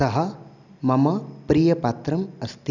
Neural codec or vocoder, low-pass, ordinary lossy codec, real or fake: none; 7.2 kHz; none; real